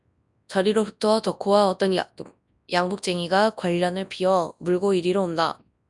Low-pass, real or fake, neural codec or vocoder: 10.8 kHz; fake; codec, 24 kHz, 0.9 kbps, WavTokenizer, large speech release